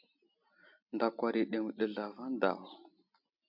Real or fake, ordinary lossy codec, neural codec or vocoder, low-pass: real; MP3, 48 kbps; none; 5.4 kHz